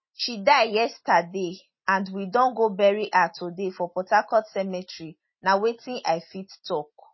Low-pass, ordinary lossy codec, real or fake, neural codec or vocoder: 7.2 kHz; MP3, 24 kbps; real; none